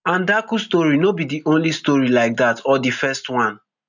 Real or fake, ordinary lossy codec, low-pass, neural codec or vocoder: real; none; 7.2 kHz; none